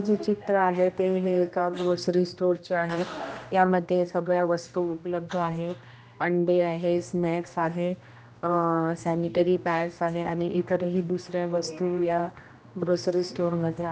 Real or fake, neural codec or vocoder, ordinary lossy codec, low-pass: fake; codec, 16 kHz, 1 kbps, X-Codec, HuBERT features, trained on general audio; none; none